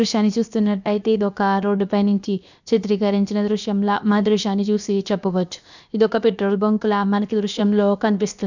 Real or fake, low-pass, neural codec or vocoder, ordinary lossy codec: fake; 7.2 kHz; codec, 16 kHz, 0.7 kbps, FocalCodec; none